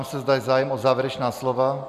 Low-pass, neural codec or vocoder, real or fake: 14.4 kHz; none; real